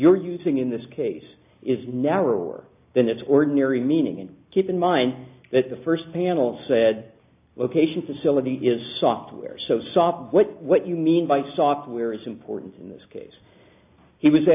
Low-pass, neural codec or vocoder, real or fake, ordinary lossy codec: 3.6 kHz; none; real; AAC, 32 kbps